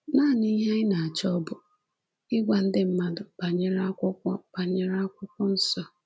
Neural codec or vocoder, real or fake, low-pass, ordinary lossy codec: none; real; none; none